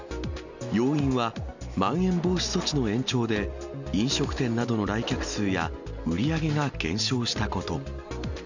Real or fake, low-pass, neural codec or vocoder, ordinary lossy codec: real; 7.2 kHz; none; none